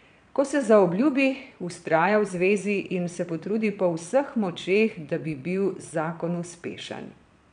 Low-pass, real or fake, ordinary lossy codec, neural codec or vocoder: 9.9 kHz; fake; none; vocoder, 22.05 kHz, 80 mel bands, Vocos